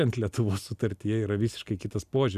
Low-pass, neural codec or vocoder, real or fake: 14.4 kHz; none; real